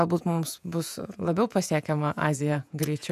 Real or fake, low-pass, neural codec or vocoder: fake; 14.4 kHz; vocoder, 48 kHz, 128 mel bands, Vocos